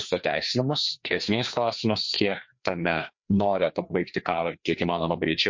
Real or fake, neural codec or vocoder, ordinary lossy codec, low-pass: fake; codec, 16 kHz, 2 kbps, FreqCodec, larger model; MP3, 48 kbps; 7.2 kHz